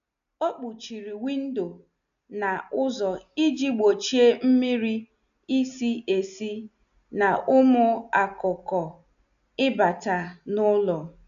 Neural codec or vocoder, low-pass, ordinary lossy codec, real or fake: none; 7.2 kHz; none; real